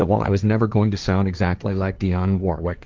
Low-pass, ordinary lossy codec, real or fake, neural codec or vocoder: 7.2 kHz; Opus, 24 kbps; fake; codec, 16 kHz, 1.1 kbps, Voila-Tokenizer